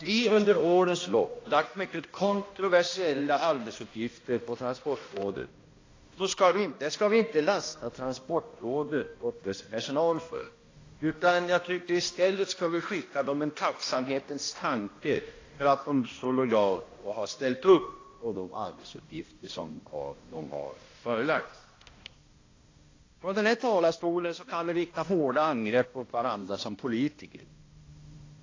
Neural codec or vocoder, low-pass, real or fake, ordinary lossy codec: codec, 16 kHz, 1 kbps, X-Codec, HuBERT features, trained on balanced general audio; 7.2 kHz; fake; AAC, 32 kbps